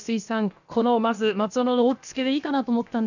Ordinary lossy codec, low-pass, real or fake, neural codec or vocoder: none; 7.2 kHz; fake; codec, 16 kHz, about 1 kbps, DyCAST, with the encoder's durations